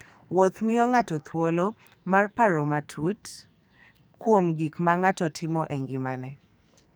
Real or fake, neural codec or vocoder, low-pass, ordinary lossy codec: fake; codec, 44.1 kHz, 2.6 kbps, SNAC; none; none